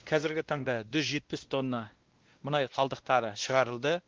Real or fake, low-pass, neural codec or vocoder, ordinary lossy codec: fake; 7.2 kHz; codec, 16 kHz, 1 kbps, X-Codec, WavLM features, trained on Multilingual LibriSpeech; Opus, 16 kbps